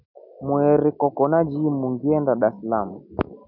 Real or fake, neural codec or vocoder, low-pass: real; none; 5.4 kHz